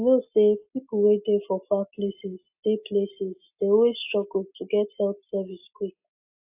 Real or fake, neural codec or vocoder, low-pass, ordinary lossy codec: real; none; 3.6 kHz; AAC, 32 kbps